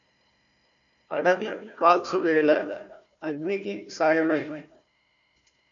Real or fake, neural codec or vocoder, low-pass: fake; codec, 16 kHz, 1 kbps, FunCodec, trained on Chinese and English, 50 frames a second; 7.2 kHz